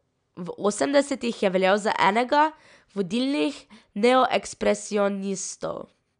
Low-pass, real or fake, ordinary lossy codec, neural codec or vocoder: 9.9 kHz; real; none; none